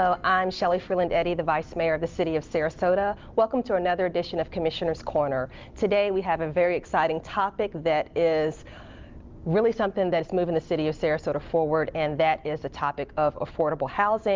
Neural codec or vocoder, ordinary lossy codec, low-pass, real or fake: none; Opus, 32 kbps; 7.2 kHz; real